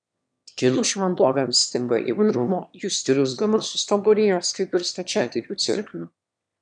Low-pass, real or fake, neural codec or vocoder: 9.9 kHz; fake; autoencoder, 22.05 kHz, a latent of 192 numbers a frame, VITS, trained on one speaker